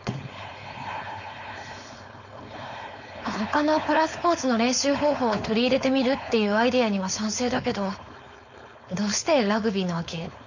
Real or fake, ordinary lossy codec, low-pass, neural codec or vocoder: fake; none; 7.2 kHz; codec, 16 kHz, 4.8 kbps, FACodec